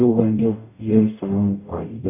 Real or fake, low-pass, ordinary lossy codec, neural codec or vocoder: fake; 3.6 kHz; none; codec, 44.1 kHz, 0.9 kbps, DAC